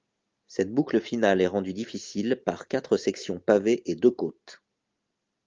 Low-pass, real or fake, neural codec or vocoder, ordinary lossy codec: 7.2 kHz; real; none; Opus, 32 kbps